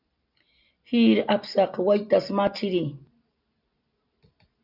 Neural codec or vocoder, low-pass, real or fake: none; 5.4 kHz; real